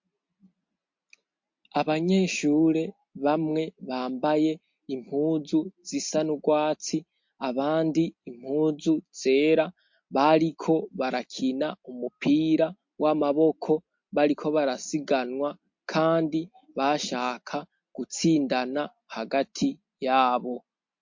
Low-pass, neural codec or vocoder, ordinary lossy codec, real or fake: 7.2 kHz; none; MP3, 48 kbps; real